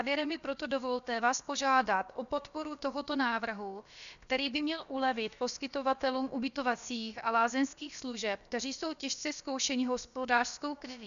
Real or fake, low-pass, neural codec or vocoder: fake; 7.2 kHz; codec, 16 kHz, about 1 kbps, DyCAST, with the encoder's durations